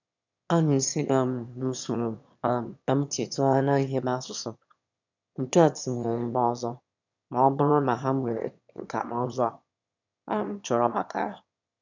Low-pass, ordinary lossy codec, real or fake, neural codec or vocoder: 7.2 kHz; none; fake; autoencoder, 22.05 kHz, a latent of 192 numbers a frame, VITS, trained on one speaker